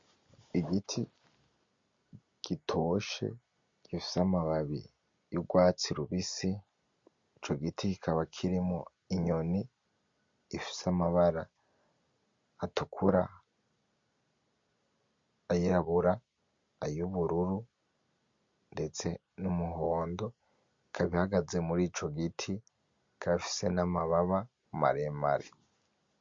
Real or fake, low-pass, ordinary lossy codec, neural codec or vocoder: real; 7.2 kHz; MP3, 48 kbps; none